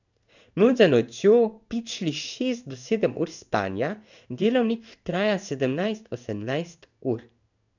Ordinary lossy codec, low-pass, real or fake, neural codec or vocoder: none; 7.2 kHz; fake; codec, 16 kHz in and 24 kHz out, 1 kbps, XY-Tokenizer